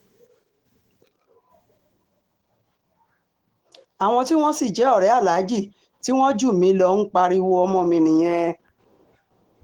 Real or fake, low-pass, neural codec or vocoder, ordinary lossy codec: fake; 19.8 kHz; autoencoder, 48 kHz, 128 numbers a frame, DAC-VAE, trained on Japanese speech; Opus, 16 kbps